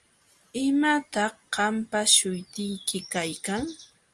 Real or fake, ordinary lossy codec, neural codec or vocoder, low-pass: real; Opus, 32 kbps; none; 10.8 kHz